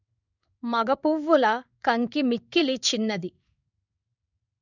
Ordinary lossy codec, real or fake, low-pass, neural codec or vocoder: none; fake; 7.2 kHz; codec, 16 kHz in and 24 kHz out, 1 kbps, XY-Tokenizer